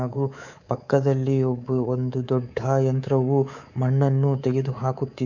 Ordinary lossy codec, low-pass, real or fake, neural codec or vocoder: none; 7.2 kHz; fake; codec, 24 kHz, 3.1 kbps, DualCodec